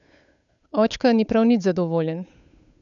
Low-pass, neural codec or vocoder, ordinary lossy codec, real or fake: 7.2 kHz; codec, 16 kHz, 8 kbps, FunCodec, trained on Chinese and English, 25 frames a second; none; fake